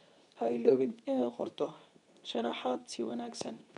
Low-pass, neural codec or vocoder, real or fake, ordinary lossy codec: 10.8 kHz; codec, 24 kHz, 0.9 kbps, WavTokenizer, medium speech release version 2; fake; none